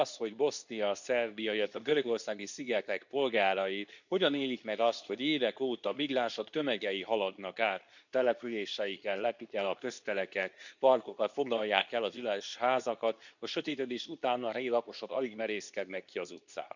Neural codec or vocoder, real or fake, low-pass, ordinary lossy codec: codec, 24 kHz, 0.9 kbps, WavTokenizer, medium speech release version 2; fake; 7.2 kHz; none